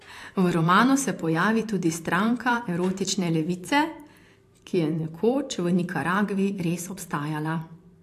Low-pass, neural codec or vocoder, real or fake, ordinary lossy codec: 14.4 kHz; vocoder, 44.1 kHz, 128 mel bands every 512 samples, BigVGAN v2; fake; AAC, 64 kbps